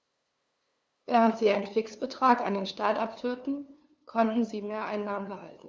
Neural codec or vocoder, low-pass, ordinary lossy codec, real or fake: codec, 16 kHz, 2 kbps, FunCodec, trained on LibriTTS, 25 frames a second; none; none; fake